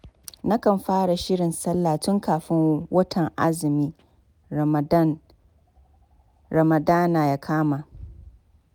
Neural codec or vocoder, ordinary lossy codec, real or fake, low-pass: none; none; real; none